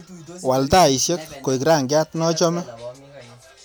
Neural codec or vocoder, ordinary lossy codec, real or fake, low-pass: none; none; real; none